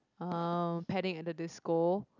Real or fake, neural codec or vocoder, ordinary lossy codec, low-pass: real; none; none; 7.2 kHz